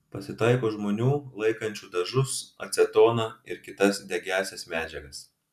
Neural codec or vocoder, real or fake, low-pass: none; real; 14.4 kHz